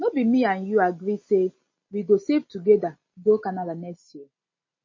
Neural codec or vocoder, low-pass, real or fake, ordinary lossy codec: none; 7.2 kHz; real; MP3, 32 kbps